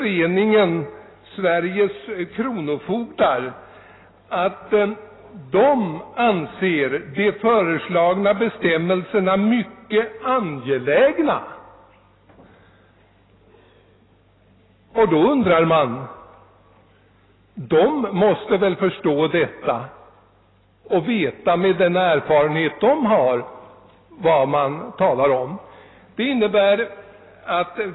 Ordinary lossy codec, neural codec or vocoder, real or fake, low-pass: AAC, 16 kbps; none; real; 7.2 kHz